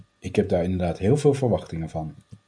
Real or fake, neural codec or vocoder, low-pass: real; none; 9.9 kHz